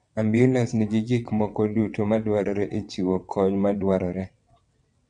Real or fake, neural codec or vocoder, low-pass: fake; vocoder, 22.05 kHz, 80 mel bands, WaveNeXt; 9.9 kHz